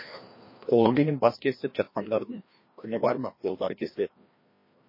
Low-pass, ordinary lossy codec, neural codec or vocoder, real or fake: 5.4 kHz; MP3, 24 kbps; codec, 16 kHz, 1 kbps, FreqCodec, larger model; fake